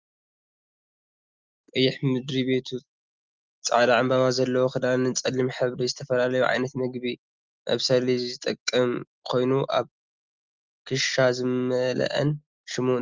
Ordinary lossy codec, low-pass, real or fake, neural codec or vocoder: Opus, 32 kbps; 7.2 kHz; real; none